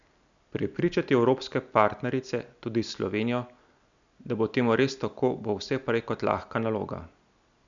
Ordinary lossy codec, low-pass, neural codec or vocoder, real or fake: none; 7.2 kHz; none; real